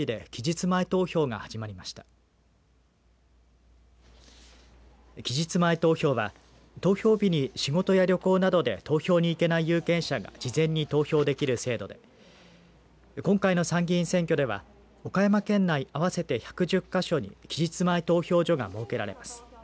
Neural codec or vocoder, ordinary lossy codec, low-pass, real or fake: none; none; none; real